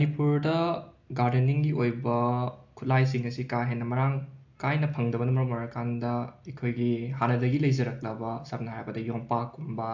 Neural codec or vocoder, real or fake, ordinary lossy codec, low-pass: none; real; AAC, 48 kbps; 7.2 kHz